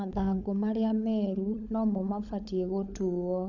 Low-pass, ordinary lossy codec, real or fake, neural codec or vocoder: 7.2 kHz; none; fake; codec, 16 kHz, 16 kbps, FunCodec, trained on LibriTTS, 50 frames a second